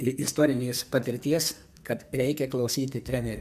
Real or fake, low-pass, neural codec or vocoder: fake; 14.4 kHz; codec, 44.1 kHz, 2.6 kbps, SNAC